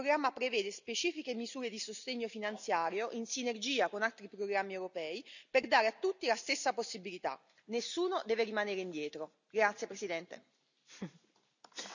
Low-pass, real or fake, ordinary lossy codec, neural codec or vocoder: 7.2 kHz; real; none; none